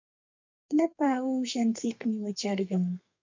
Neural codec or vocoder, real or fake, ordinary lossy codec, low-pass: codec, 32 kHz, 1.9 kbps, SNAC; fake; MP3, 64 kbps; 7.2 kHz